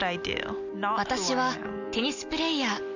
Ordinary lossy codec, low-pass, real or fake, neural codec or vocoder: none; 7.2 kHz; real; none